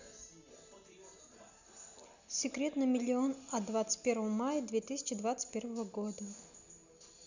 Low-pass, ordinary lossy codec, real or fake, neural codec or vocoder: 7.2 kHz; none; real; none